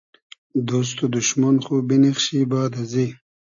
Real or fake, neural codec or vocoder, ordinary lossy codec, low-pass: real; none; AAC, 64 kbps; 7.2 kHz